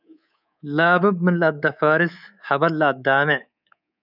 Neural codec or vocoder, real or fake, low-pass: codec, 24 kHz, 3.1 kbps, DualCodec; fake; 5.4 kHz